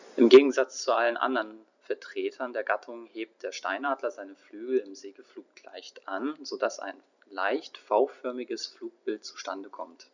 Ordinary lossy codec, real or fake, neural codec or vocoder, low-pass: none; fake; vocoder, 44.1 kHz, 128 mel bands every 256 samples, BigVGAN v2; 7.2 kHz